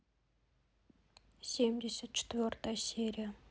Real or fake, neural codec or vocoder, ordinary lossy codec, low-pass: real; none; none; none